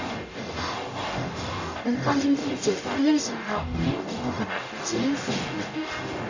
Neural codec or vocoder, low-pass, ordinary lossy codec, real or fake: codec, 44.1 kHz, 0.9 kbps, DAC; 7.2 kHz; none; fake